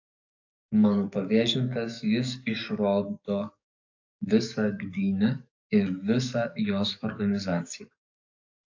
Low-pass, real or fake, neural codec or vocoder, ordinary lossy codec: 7.2 kHz; fake; codec, 44.1 kHz, 7.8 kbps, DAC; AAC, 48 kbps